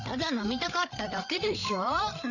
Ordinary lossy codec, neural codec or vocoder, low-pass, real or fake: none; codec, 16 kHz, 8 kbps, FreqCodec, larger model; 7.2 kHz; fake